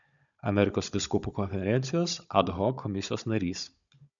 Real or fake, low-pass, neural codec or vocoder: fake; 7.2 kHz; codec, 16 kHz, 16 kbps, FunCodec, trained on Chinese and English, 50 frames a second